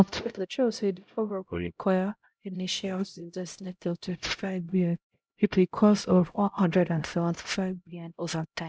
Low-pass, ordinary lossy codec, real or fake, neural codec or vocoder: none; none; fake; codec, 16 kHz, 0.5 kbps, X-Codec, HuBERT features, trained on LibriSpeech